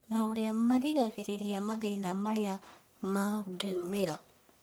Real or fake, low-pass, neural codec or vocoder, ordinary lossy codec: fake; none; codec, 44.1 kHz, 1.7 kbps, Pupu-Codec; none